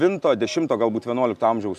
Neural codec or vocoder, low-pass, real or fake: none; 14.4 kHz; real